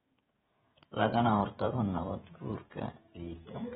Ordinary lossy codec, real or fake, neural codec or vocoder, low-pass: AAC, 16 kbps; fake; codec, 16 kHz, 16 kbps, FreqCodec, smaller model; 7.2 kHz